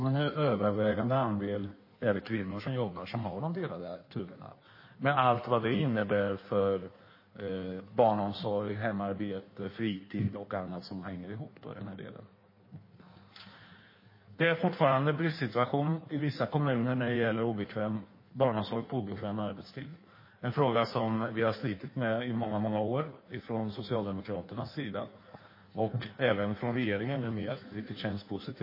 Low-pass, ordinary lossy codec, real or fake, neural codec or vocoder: 5.4 kHz; MP3, 24 kbps; fake; codec, 16 kHz in and 24 kHz out, 1.1 kbps, FireRedTTS-2 codec